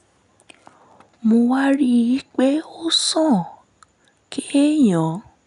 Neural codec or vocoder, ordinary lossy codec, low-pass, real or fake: none; none; 10.8 kHz; real